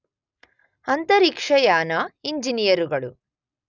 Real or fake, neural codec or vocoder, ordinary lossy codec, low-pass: real; none; none; 7.2 kHz